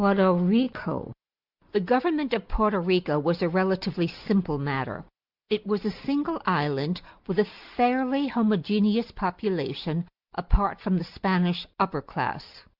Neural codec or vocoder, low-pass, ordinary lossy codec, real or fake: none; 5.4 kHz; Opus, 64 kbps; real